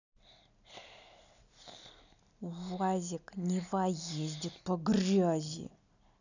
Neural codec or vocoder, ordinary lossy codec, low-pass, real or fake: none; none; 7.2 kHz; real